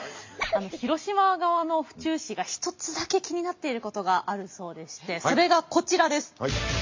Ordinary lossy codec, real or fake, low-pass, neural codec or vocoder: MP3, 48 kbps; real; 7.2 kHz; none